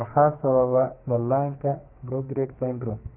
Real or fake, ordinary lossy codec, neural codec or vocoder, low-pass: fake; Opus, 24 kbps; codec, 44.1 kHz, 2.6 kbps, SNAC; 3.6 kHz